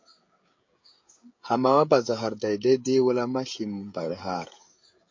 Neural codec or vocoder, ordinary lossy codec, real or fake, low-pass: codec, 16 kHz, 16 kbps, FreqCodec, smaller model; MP3, 48 kbps; fake; 7.2 kHz